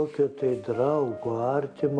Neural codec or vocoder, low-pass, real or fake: none; 9.9 kHz; real